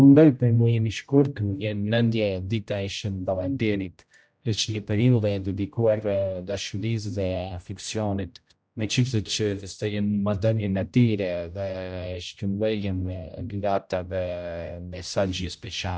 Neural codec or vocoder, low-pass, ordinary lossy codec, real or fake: codec, 16 kHz, 0.5 kbps, X-Codec, HuBERT features, trained on general audio; none; none; fake